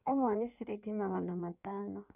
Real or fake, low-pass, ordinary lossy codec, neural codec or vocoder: fake; 3.6 kHz; none; codec, 16 kHz in and 24 kHz out, 1.1 kbps, FireRedTTS-2 codec